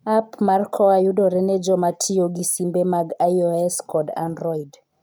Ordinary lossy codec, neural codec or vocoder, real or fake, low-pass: none; none; real; none